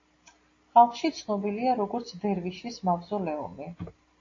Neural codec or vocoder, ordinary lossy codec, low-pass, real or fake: none; AAC, 32 kbps; 7.2 kHz; real